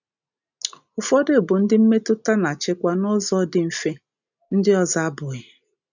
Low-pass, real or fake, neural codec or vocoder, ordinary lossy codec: 7.2 kHz; real; none; none